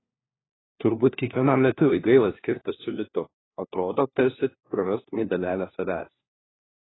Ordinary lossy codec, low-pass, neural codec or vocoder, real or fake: AAC, 16 kbps; 7.2 kHz; codec, 16 kHz, 1 kbps, FunCodec, trained on LibriTTS, 50 frames a second; fake